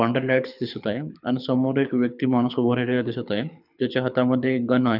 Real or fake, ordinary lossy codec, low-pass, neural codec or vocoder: fake; none; 5.4 kHz; codec, 24 kHz, 6 kbps, HILCodec